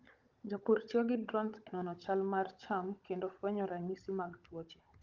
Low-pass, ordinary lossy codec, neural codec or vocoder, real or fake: 7.2 kHz; Opus, 32 kbps; codec, 16 kHz, 16 kbps, FunCodec, trained on Chinese and English, 50 frames a second; fake